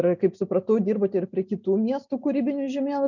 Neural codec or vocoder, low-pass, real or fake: none; 7.2 kHz; real